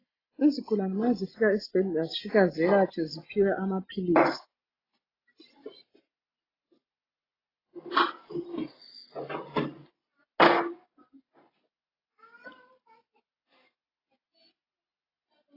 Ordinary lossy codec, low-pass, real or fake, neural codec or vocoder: AAC, 24 kbps; 5.4 kHz; real; none